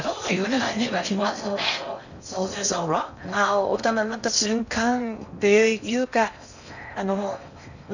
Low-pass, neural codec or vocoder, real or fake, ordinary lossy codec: 7.2 kHz; codec, 16 kHz in and 24 kHz out, 0.6 kbps, FocalCodec, streaming, 4096 codes; fake; none